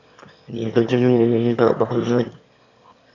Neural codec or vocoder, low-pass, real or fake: autoencoder, 22.05 kHz, a latent of 192 numbers a frame, VITS, trained on one speaker; 7.2 kHz; fake